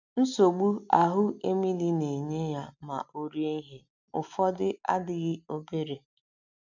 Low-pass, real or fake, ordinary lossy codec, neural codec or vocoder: 7.2 kHz; real; none; none